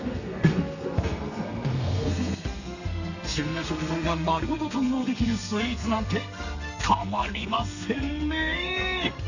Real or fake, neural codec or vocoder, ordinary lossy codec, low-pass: fake; codec, 32 kHz, 1.9 kbps, SNAC; none; 7.2 kHz